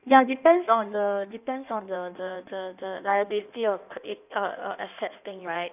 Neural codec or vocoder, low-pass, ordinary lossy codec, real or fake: codec, 16 kHz in and 24 kHz out, 1.1 kbps, FireRedTTS-2 codec; 3.6 kHz; none; fake